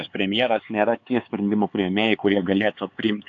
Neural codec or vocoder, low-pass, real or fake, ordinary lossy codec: codec, 16 kHz, 4 kbps, X-Codec, HuBERT features, trained on LibriSpeech; 7.2 kHz; fake; AAC, 48 kbps